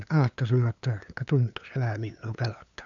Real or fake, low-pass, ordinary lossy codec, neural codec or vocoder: fake; 7.2 kHz; none; codec, 16 kHz, 2 kbps, FunCodec, trained on LibriTTS, 25 frames a second